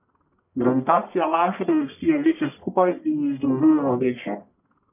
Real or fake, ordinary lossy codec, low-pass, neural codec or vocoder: fake; none; 3.6 kHz; codec, 44.1 kHz, 1.7 kbps, Pupu-Codec